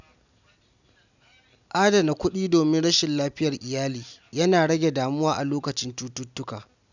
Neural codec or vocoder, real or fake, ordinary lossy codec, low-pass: none; real; none; 7.2 kHz